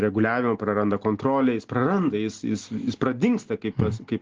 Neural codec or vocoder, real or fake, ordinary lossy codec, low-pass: none; real; Opus, 16 kbps; 7.2 kHz